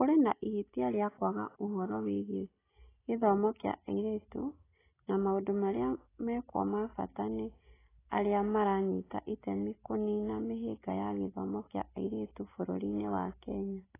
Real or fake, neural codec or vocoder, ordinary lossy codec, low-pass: real; none; AAC, 16 kbps; 3.6 kHz